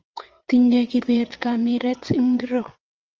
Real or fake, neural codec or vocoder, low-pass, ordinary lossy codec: fake; vocoder, 22.05 kHz, 80 mel bands, WaveNeXt; 7.2 kHz; Opus, 24 kbps